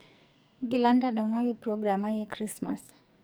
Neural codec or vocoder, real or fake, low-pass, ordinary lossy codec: codec, 44.1 kHz, 2.6 kbps, SNAC; fake; none; none